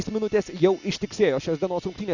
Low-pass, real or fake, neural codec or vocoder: 7.2 kHz; real; none